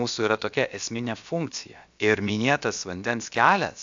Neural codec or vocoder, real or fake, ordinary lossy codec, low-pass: codec, 16 kHz, about 1 kbps, DyCAST, with the encoder's durations; fake; AAC, 64 kbps; 7.2 kHz